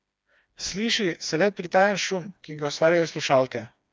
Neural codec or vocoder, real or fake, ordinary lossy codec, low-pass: codec, 16 kHz, 2 kbps, FreqCodec, smaller model; fake; none; none